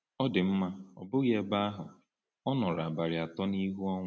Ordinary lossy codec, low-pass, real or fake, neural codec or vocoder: none; none; real; none